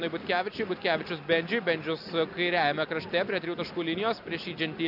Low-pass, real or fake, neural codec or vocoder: 5.4 kHz; real; none